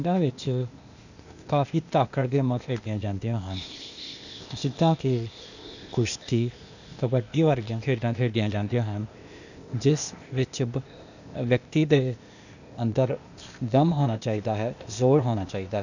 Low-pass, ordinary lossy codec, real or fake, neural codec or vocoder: 7.2 kHz; none; fake; codec, 16 kHz, 0.8 kbps, ZipCodec